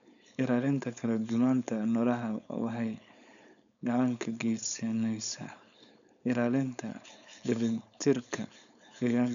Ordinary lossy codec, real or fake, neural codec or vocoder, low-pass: none; fake; codec, 16 kHz, 4.8 kbps, FACodec; 7.2 kHz